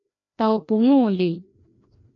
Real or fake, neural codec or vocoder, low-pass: fake; codec, 16 kHz, 1 kbps, FreqCodec, larger model; 7.2 kHz